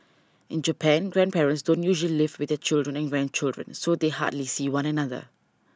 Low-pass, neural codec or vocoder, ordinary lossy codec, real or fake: none; none; none; real